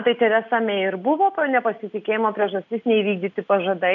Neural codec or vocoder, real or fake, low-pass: none; real; 7.2 kHz